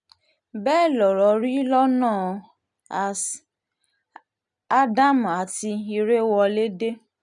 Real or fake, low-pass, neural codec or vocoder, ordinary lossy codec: real; 10.8 kHz; none; none